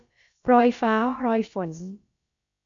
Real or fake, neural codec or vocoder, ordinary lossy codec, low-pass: fake; codec, 16 kHz, about 1 kbps, DyCAST, with the encoder's durations; AAC, 64 kbps; 7.2 kHz